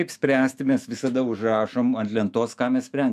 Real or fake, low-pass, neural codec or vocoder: fake; 14.4 kHz; autoencoder, 48 kHz, 128 numbers a frame, DAC-VAE, trained on Japanese speech